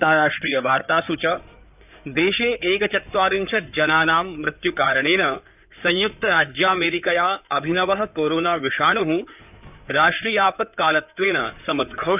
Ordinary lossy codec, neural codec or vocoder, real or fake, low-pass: none; codec, 16 kHz in and 24 kHz out, 2.2 kbps, FireRedTTS-2 codec; fake; 3.6 kHz